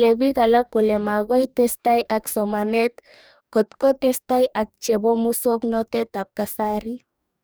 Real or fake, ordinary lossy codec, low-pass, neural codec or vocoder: fake; none; none; codec, 44.1 kHz, 2.6 kbps, DAC